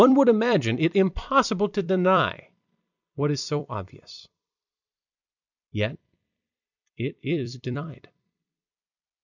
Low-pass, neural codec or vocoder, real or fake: 7.2 kHz; vocoder, 44.1 kHz, 128 mel bands every 256 samples, BigVGAN v2; fake